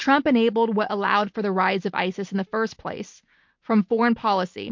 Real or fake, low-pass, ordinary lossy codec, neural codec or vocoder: real; 7.2 kHz; MP3, 48 kbps; none